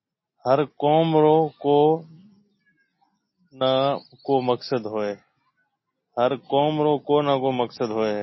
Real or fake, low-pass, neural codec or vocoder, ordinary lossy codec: real; 7.2 kHz; none; MP3, 24 kbps